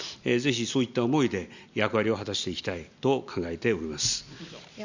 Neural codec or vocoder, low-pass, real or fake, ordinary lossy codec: none; 7.2 kHz; real; Opus, 64 kbps